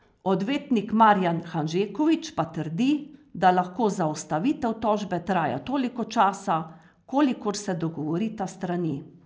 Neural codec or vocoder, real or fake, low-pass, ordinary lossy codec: none; real; none; none